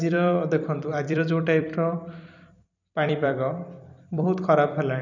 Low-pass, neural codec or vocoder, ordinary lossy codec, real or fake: 7.2 kHz; vocoder, 44.1 kHz, 128 mel bands every 256 samples, BigVGAN v2; none; fake